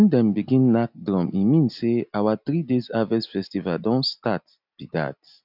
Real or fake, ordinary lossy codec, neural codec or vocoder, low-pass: real; none; none; 5.4 kHz